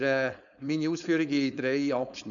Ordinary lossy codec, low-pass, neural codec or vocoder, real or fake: none; 7.2 kHz; codec, 16 kHz, 4.8 kbps, FACodec; fake